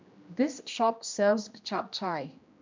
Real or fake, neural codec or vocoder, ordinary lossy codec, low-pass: fake; codec, 16 kHz, 1 kbps, X-Codec, HuBERT features, trained on general audio; MP3, 64 kbps; 7.2 kHz